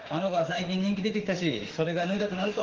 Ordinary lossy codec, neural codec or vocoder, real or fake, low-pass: Opus, 16 kbps; autoencoder, 48 kHz, 32 numbers a frame, DAC-VAE, trained on Japanese speech; fake; 7.2 kHz